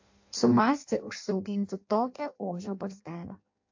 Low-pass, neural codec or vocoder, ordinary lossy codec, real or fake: 7.2 kHz; codec, 16 kHz in and 24 kHz out, 0.6 kbps, FireRedTTS-2 codec; AAC, 48 kbps; fake